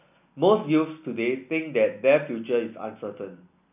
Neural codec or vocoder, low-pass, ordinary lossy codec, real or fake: none; 3.6 kHz; none; real